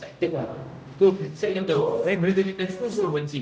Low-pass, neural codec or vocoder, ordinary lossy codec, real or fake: none; codec, 16 kHz, 0.5 kbps, X-Codec, HuBERT features, trained on general audio; none; fake